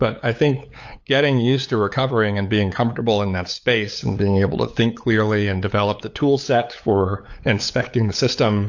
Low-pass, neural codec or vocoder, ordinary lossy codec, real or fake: 7.2 kHz; codec, 16 kHz, 8 kbps, FunCodec, trained on LibriTTS, 25 frames a second; AAC, 48 kbps; fake